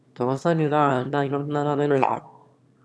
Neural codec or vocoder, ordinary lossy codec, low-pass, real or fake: autoencoder, 22.05 kHz, a latent of 192 numbers a frame, VITS, trained on one speaker; none; none; fake